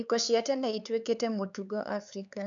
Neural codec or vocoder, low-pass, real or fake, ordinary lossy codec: codec, 16 kHz, 4 kbps, X-Codec, HuBERT features, trained on LibriSpeech; 7.2 kHz; fake; none